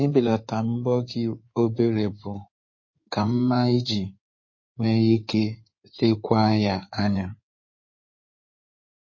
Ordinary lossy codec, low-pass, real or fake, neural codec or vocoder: MP3, 32 kbps; 7.2 kHz; fake; codec, 16 kHz in and 24 kHz out, 2.2 kbps, FireRedTTS-2 codec